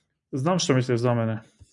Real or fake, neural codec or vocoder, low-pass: real; none; 10.8 kHz